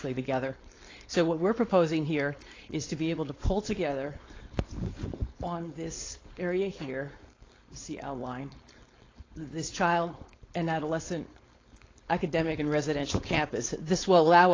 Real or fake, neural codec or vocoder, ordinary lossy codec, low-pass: fake; codec, 16 kHz, 4.8 kbps, FACodec; AAC, 32 kbps; 7.2 kHz